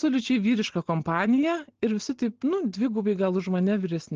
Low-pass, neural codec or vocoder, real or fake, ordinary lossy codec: 7.2 kHz; none; real; Opus, 16 kbps